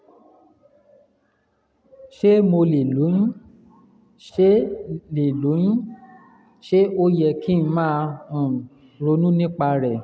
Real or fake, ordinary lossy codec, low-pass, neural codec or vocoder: real; none; none; none